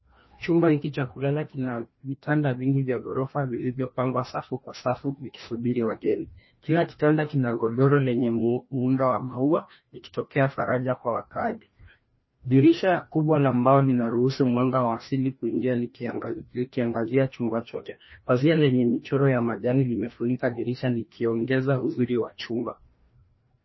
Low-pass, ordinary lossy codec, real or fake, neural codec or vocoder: 7.2 kHz; MP3, 24 kbps; fake; codec, 16 kHz, 1 kbps, FreqCodec, larger model